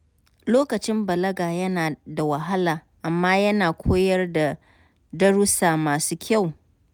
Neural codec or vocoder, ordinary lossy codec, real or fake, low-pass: none; none; real; none